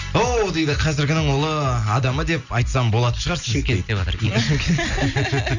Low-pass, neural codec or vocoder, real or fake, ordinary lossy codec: 7.2 kHz; none; real; none